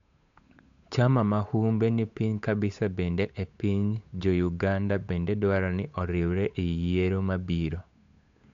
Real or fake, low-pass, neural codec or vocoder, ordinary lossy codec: fake; 7.2 kHz; codec, 16 kHz, 8 kbps, FunCodec, trained on Chinese and English, 25 frames a second; MP3, 64 kbps